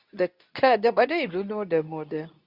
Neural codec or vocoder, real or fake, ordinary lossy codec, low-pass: codec, 24 kHz, 0.9 kbps, WavTokenizer, medium speech release version 2; fake; Opus, 64 kbps; 5.4 kHz